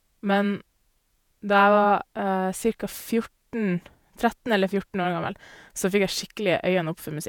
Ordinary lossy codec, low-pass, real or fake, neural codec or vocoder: none; none; fake; vocoder, 48 kHz, 128 mel bands, Vocos